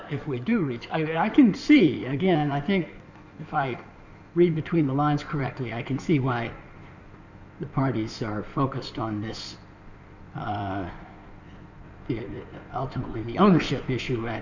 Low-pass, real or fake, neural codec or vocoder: 7.2 kHz; fake; codec, 16 kHz in and 24 kHz out, 2.2 kbps, FireRedTTS-2 codec